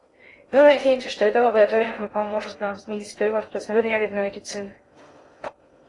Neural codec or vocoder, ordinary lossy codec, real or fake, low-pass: codec, 16 kHz in and 24 kHz out, 0.6 kbps, FocalCodec, streaming, 2048 codes; AAC, 32 kbps; fake; 10.8 kHz